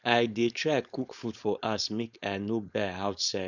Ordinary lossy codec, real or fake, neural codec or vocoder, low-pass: none; fake; codec, 16 kHz, 4.8 kbps, FACodec; 7.2 kHz